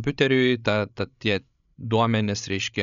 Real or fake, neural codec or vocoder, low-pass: fake; codec, 16 kHz, 16 kbps, FreqCodec, larger model; 7.2 kHz